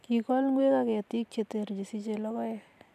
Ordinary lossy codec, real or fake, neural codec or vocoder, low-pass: none; real; none; 14.4 kHz